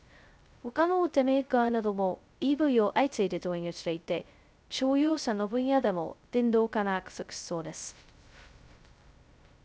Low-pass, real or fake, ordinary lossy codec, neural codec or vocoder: none; fake; none; codec, 16 kHz, 0.2 kbps, FocalCodec